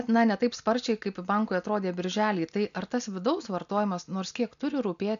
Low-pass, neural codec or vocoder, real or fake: 7.2 kHz; none; real